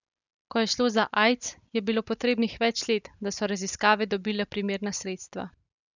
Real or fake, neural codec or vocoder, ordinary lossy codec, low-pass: real; none; none; 7.2 kHz